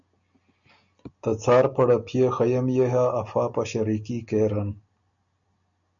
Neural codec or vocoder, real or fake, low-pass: none; real; 7.2 kHz